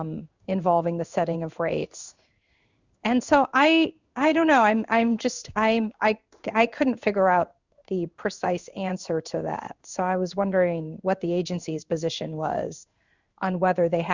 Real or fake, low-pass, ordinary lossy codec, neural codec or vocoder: fake; 7.2 kHz; Opus, 64 kbps; codec, 16 kHz in and 24 kHz out, 1 kbps, XY-Tokenizer